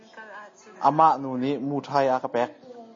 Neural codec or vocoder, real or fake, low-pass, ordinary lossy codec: none; real; 7.2 kHz; MP3, 32 kbps